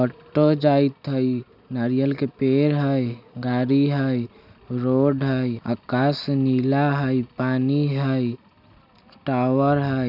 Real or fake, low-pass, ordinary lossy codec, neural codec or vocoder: real; 5.4 kHz; none; none